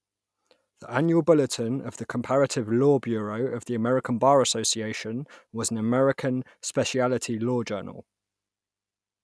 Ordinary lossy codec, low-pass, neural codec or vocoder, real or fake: none; none; none; real